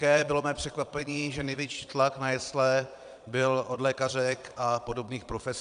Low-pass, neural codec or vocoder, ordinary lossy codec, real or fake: 9.9 kHz; vocoder, 22.05 kHz, 80 mel bands, Vocos; AAC, 96 kbps; fake